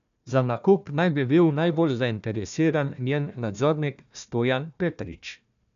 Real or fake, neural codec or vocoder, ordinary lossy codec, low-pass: fake; codec, 16 kHz, 1 kbps, FunCodec, trained on Chinese and English, 50 frames a second; none; 7.2 kHz